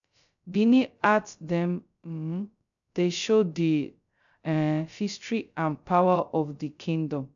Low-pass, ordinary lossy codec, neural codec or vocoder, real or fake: 7.2 kHz; none; codec, 16 kHz, 0.2 kbps, FocalCodec; fake